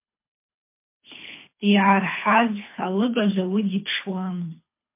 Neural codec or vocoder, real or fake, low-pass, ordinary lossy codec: codec, 24 kHz, 3 kbps, HILCodec; fake; 3.6 kHz; MP3, 24 kbps